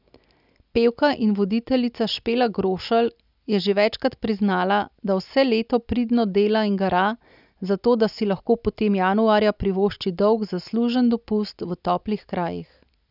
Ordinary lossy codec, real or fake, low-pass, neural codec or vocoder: none; real; 5.4 kHz; none